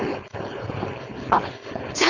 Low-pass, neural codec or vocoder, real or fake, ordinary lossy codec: 7.2 kHz; codec, 16 kHz, 4.8 kbps, FACodec; fake; none